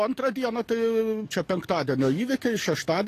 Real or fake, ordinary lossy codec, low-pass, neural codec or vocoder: fake; AAC, 48 kbps; 14.4 kHz; codec, 44.1 kHz, 7.8 kbps, DAC